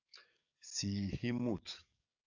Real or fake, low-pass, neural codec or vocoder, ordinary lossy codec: fake; 7.2 kHz; codec, 44.1 kHz, 7.8 kbps, DAC; AAC, 48 kbps